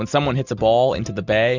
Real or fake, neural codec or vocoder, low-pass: real; none; 7.2 kHz